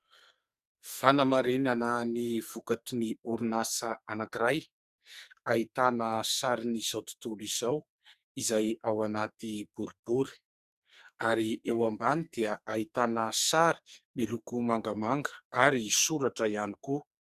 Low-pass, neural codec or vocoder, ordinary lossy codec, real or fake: 14.4 kHz; codec, 44.1 kHz, 2.6 kbps, SNAC; Opus, 64 kbps; fake